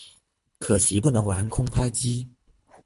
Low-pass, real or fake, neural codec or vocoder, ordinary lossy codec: 10.8 kHz; fake; codec, 24 kHz, 3 kbps, HILCodec; MP3, 64 kbps